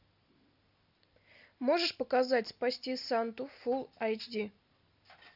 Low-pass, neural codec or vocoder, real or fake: 5.4 kHz; none; real